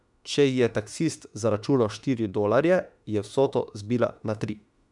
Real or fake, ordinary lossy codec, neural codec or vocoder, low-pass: fake; none; autoencoder, 48 kHz, 32 numbers a frame, DAC-VAE, trained on Japanese speech; 10.8 kHz